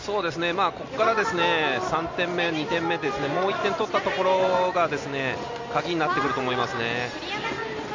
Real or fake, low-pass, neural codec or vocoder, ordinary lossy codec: real; 7.2 kHz; none; MP3, 48 kbps